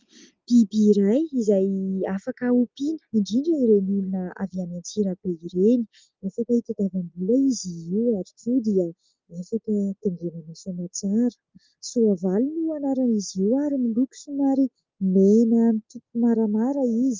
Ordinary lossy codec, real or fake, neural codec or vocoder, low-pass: Opus, 32 kbps; real; none; 7.2 kHz